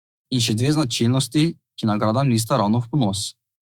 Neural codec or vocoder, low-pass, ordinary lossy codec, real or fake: autoencoder, 48 kHz, 128 numbers a frame, DAC-VAE, trained on Japanese speech; 19.8 kHz; Opus, 64 kbps; fake